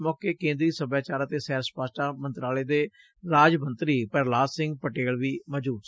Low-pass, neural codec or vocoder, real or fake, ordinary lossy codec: none; none; real; none